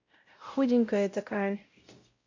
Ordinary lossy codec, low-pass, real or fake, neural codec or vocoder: MP3, 48 kbps; 7.2 kHz; fake; codec, 16 kHz, 0.5 kbps, X-Codec, WavLM features, trained on Multilingual LibriSpeech